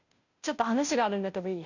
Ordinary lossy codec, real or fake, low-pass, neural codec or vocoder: none; fake; 7.2 kHz; codec, 16 kHz, 0.5 kbps, FunCodec, trained on Chinese and English, 25 frames a second